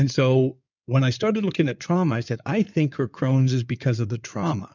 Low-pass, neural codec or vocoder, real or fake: 7.2 kHz; codec, 16 kHz in and 24 kHz out, 2.2 kbps, FireRedTTS-2 codec; fake